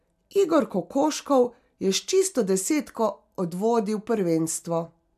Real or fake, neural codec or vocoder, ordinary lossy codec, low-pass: real; none; none; 14.4 kHz